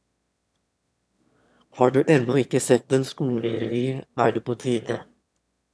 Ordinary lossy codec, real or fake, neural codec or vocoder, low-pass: none; fake; autoencoder, 22.05 kHz, a latent of 192 numbers a frame, VITS, trained on one speaker; none